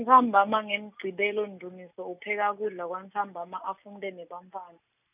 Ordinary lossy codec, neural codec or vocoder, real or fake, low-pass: none; none; real; 3.6 kHz